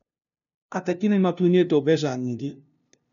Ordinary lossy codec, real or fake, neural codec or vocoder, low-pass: none; fake; codec, 16 kHz, 0.5 kbps, FunCodec, trained on LibriTTS, 25 frames a second; 7.2 kHz